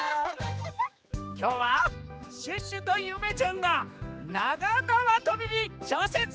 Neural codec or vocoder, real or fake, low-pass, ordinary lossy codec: codec, 16 kHz, 2 kbps, X-Codec, HuBERT features, trained on general audio; fake; none; none